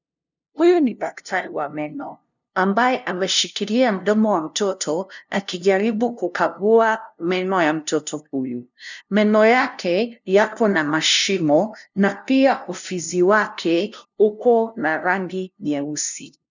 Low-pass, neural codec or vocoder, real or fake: 7.2 kHz; codec, 16 kHz, 0.5 kbps, FunCodec, trained on LibriTTS, 25 frames a second; fake